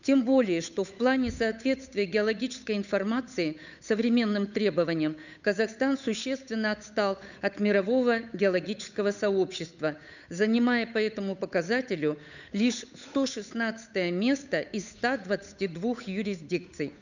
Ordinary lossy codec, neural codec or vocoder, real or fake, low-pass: none; codec, 16 kHz, 8 kbps, FunCodec, trained on Chinese and English, 25 frames a second; fake; 7.2 kHz